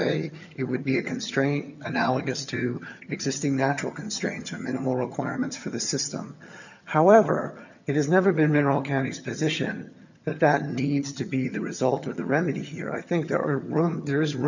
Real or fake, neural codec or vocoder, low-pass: fake; vocoder, 22.05 kHz, 80 mel bands, HiFi-GAN; 7.2 kHz